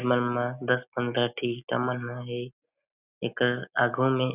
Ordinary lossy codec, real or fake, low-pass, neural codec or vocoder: none; real; 3.6 kHz; none